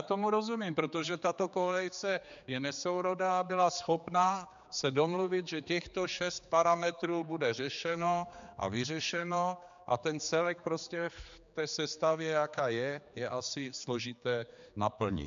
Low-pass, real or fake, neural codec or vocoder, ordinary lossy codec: 7.2 kHz; fake; codec, 16 kHz, 4 kbps, X-Codec, HuBERT features, trained on general audio; MP3, 64 kbps